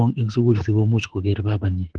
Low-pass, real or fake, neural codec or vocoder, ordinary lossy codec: 9.9 kHz; fake; autoencoder, 48 kHz, 32 numbers a frame, DAC-VAE, trained on Japanese speech; Opus, 16 kbps